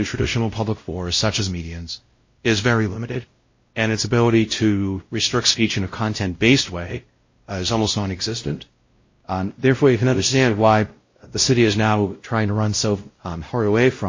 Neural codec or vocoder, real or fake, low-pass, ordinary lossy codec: codec, 16 kHz, 0.5 kbps, X-Codec, WavLM features, trained on Multilingual LibriSpeech; fake; 7.2 kHz; MP3, 32 kbps